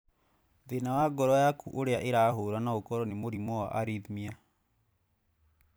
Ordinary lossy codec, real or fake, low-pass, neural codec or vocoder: none; fake; none; vocoder, 44.1 kHz, 128 mel bands every 256 samples, BigVGAN v2